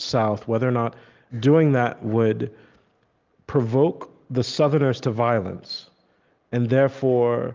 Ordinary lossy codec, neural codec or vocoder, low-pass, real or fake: Opus, 24 kbps; none; 7.2 kHz; real